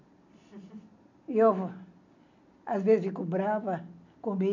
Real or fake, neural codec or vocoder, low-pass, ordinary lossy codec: real; none; 7.2 kHz; none